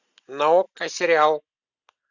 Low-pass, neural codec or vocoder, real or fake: 7.2 kHz; none; real